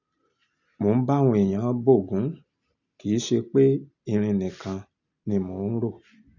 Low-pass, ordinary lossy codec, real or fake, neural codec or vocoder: 7.2 kHz; none; real; none